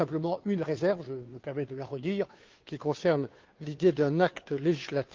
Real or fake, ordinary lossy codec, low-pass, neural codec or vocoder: fake; Opus, 32 kbps; 7.2 kHz; codec, 16 kHz, 2 kbps, FunCodec, trained on Chinese and English, 25 frames a second